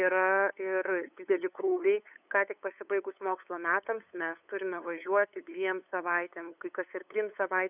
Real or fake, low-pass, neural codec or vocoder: fake; 3.6 kHz; codec, 16 kHz, 8 kbps, FunCodec, trained on LibriTTS, 25 frames a second